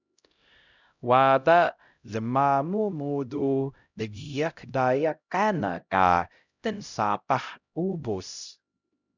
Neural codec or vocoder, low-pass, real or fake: codec, 16 kHz, 0.5 kbps, X-Codec, HuBERT features, trained on LibriSpeech; 7.2 kHz; fake